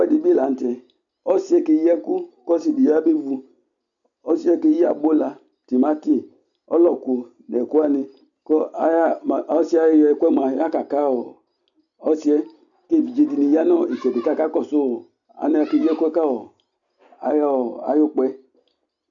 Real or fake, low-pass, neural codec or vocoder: real; 7.2 kHz; none